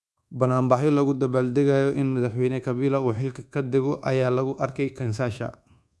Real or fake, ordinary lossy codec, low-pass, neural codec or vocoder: fake; none; none; codec, 24 kHz, 1.2 kbps, DualCodec